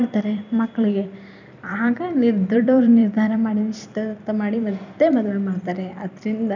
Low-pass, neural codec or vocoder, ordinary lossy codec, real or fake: 7.2 kHz; vocoder, 44.1 kHz, 128 mel bands every 256 samples, BigVGAN v2; none; fake